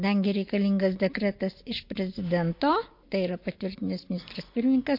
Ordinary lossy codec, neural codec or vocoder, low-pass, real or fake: MP3, 32 kbps; none; 5.4 kHz; real